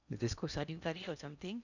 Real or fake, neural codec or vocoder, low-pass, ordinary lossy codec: fake; codec, 16 kHz in and 24 kHz out, 0.8 kbps, FocalCodec, streaming, 65536 codes; 7.2 kHz; none